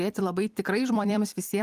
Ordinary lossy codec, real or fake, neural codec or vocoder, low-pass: Opus, 24 kbps; fake; vocoder, 44.1 kHz, 128 mel bands every 256 samples, BigVGAN v2; 14.4 kHz